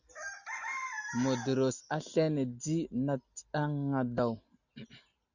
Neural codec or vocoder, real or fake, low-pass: none; real; 7.2 kHz